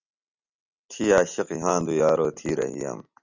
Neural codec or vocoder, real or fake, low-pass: none; real; 7.2 kHz